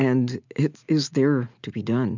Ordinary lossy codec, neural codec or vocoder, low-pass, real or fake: MP3, 64 kbps; none; 7.2 kHz; real